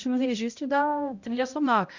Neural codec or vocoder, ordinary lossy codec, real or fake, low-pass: codec, 16 kHz, 0.5 kbps, X-Codec, HuBERT features, trained on balanced general audio; Opus, 64 kbps; fake; 7.2 kHz